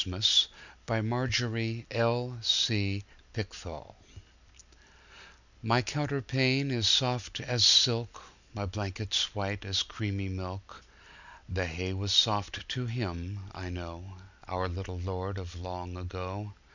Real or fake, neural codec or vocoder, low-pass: real; none; 7.2 kHz